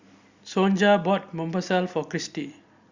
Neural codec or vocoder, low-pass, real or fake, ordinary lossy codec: none; 7.2 kHz; real; Opus, 64 kbps